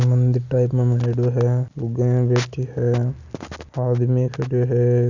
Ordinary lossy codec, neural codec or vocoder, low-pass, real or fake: none; none; 7.2 kHz; real